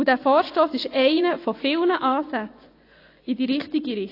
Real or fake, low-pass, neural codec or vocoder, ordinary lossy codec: fake; 5.4 kHz; vocoder, 24 kHz, 100 mel bands, Vocos; AAC, 32 kbps